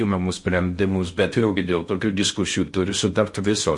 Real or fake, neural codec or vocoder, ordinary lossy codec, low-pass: fake; codec, 16 kHz in and 24 kHz out, 0.6 kbps, FocalCodec, streaming, 4096 codes; MP3, 48 kbps; 10.8 kHz